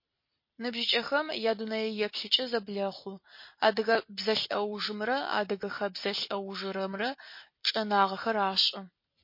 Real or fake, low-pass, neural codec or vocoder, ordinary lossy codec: fake; 5.4 kHz; vocoder, 44.1 kHz, 128 mel bands every 256 samples, BigVGAN v2; MP3, 32 kbps